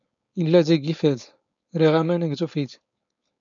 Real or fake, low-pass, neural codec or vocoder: fake; 7.2 kHz; codec, 16 kHz, 4.8 kbps, FACodec